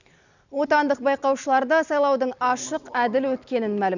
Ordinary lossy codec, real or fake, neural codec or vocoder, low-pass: none; real; none; 7.2 kHz